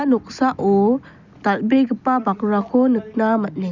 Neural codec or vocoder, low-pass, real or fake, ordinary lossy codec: none; 7.2 kHz; real; none